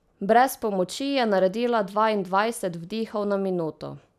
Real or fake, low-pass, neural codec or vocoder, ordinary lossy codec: real; 14.4 kHz; none; none